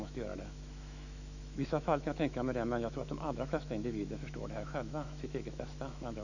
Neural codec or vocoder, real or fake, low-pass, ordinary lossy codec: autoencoder, 48 kHz, 128 numbers a frame, DAC-VAE, trained on Japanese speech; fake; 7.2 kHz; none